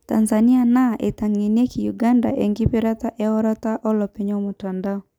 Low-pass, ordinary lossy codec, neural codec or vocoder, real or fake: 19.8 kHz; none; none; real